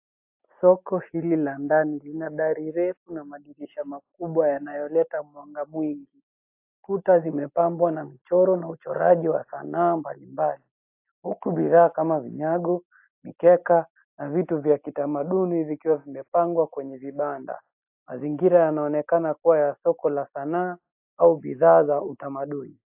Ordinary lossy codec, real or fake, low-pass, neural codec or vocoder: AAC, 32 kbps; real; 3.6 kHz; none